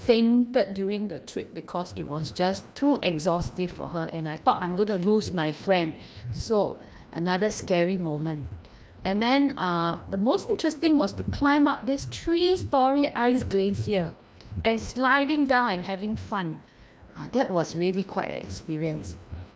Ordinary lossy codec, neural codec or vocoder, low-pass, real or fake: none; codec, 16 kHz, 1 kbps, FreqCodec, larger model; none; fake